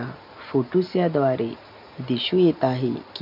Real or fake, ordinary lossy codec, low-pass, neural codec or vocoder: real; MP3, 48 kbps; 5.4 kHz; none